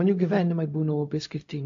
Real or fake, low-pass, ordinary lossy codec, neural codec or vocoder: fake; 7.2 kHz; AAC, 48 kbps; codec, 16 kHz, 0.4 kbps, LongCat-Audio-Codec